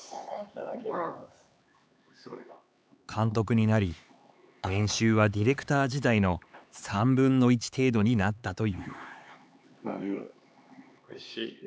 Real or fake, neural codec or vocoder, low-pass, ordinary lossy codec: fake; codec, 16 kHz, 4 kbps, X-Codec, HuBERT features, trained on LibriSpeech; none; none